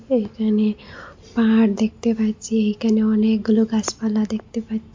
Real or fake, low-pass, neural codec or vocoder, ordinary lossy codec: real; 7.2 kHz; none; MP3, 48 kbps